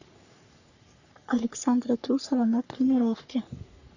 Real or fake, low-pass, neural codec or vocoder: fake; 7.2 kHz; codec, 44.1 kHz, 3.4 kbps, Pupu-Codec